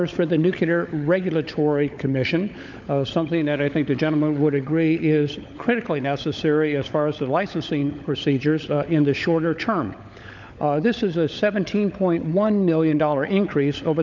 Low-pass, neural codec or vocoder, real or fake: 7.2 kHz; codec, 16 kHz, 16 kbps, FunCodec, trained on LibriTTS, 50 frames a second; fake